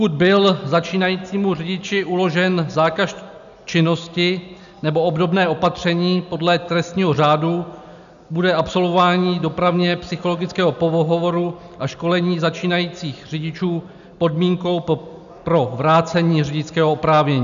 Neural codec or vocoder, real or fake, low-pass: none; real; 7.2 kHz